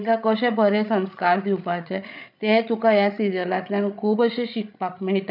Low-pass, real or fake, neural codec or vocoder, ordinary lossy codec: 5.4 kHz; fake; codec, 16 kHz, 16 kbps, FreqCodec, larger model; none